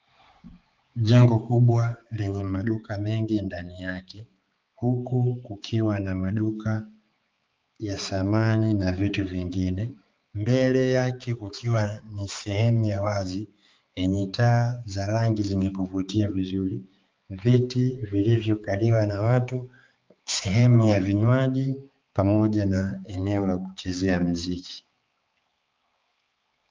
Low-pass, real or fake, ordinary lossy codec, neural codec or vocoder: 7.2 kHz; fake; Opus, 24 kbps; codec, 16 kHz, 4 kbps, X-Codec, HuBERT features, trained on balanced general audio